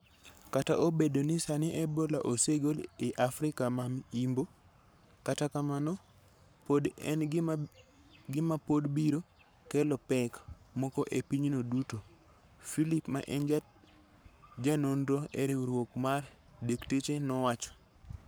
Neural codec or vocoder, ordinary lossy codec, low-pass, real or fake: codec, 44.1 kHz, 7.8 kbps, Pupu-Codec; none; none; fake